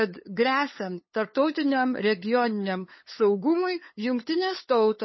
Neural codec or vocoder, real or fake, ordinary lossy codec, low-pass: codec, 16 kHz, 8 kbps, FunCodec, trained on LibriTTS, 25 frames a second; fake; MP3, 24 kbps; 7.2 kHz